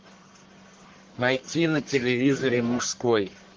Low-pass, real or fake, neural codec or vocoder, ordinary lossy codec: 7.2 kHz; fake; codec, 44.1 kHz, 1.7 kbps, Pupu-Codec; Opus, 16 kbps